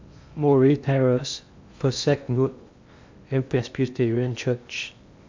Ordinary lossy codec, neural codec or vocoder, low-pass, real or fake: MP3, 64 kbps; codec, 16 kHz in and 24 kHz out, 0.6 kbps, FocalCodec, streaming, 2048 codes; 7.2 kHz; fake